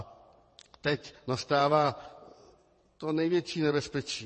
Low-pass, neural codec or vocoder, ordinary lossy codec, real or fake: 9.9 kHz; vocoder, 22.05 kHz, 80 mel bands, WaveNeXt; MP3, 32 kbps; fake